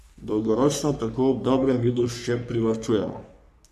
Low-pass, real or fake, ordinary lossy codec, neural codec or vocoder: 14.4 kHz; fake; none; codec, 44.1 kHz, 3.4 kbps, Pupu-Codec